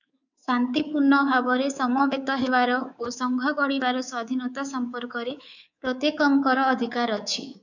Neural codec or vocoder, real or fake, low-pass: codec, 24 kHz, 3.1 kbps, DualCodec; fake; 7.2 kHz